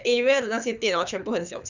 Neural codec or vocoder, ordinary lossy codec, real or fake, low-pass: codec, 24 kHz, 6 kbps, HILCodec; none; fake; 7.2 kHz